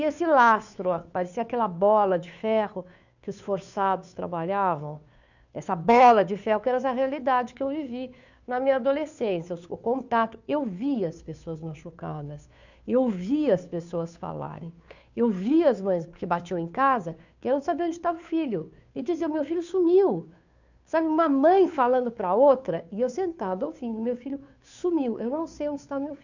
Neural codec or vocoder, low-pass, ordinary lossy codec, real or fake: codec, 16 kHz, 2 kbps, FunCodec, trained on Chinese and English, 25 frames a second; 7.2 kHz; none; fake